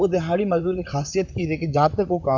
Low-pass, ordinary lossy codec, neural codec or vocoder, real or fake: 7.2 kHz; none; vocoder, 44.1 kHz, 128 mel bands, Pupu-Vocoder; fake